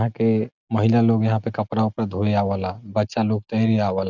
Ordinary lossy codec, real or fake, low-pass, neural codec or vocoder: none; real; 7.2 kHz; none